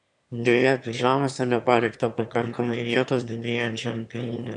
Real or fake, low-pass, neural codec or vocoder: fake; 9.9 kHz; autoencoder, 22.05 kHz, a latent of 192 numbers a frame, VITS, trained on one speaker